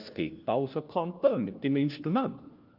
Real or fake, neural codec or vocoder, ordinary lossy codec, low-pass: fake; codec, 16 kHz, 1 kbps, FunCodec, trained on LibriTTS, 50 frames a second; Opus, 24 kbps; 5.4 kHz